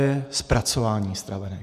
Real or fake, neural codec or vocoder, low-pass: fake; vocoder, 48 kHz, 128 mel bands, Vocos; 14.4 kHz